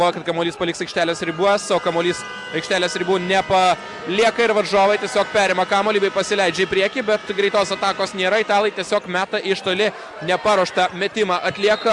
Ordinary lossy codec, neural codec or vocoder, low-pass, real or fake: Opus, 64 kbps; none; 10.8 kHz; real